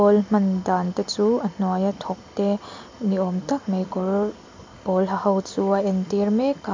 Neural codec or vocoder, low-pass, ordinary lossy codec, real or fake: none; 7.2 kHz; MP3, 48 kbps; real